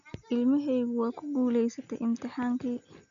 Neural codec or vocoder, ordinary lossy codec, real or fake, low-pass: none; none; real; 7.2 kHz